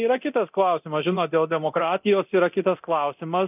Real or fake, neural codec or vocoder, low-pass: fake; codec, 24 kHz, 0.9 kbps, DualCodec; 3.6 kHz